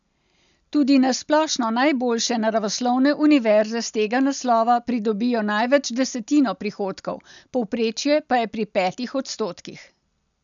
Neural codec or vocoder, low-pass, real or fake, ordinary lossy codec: none; 7.2 kHz; real; none